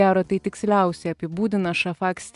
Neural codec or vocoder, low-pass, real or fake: none; 10.8 kHz; real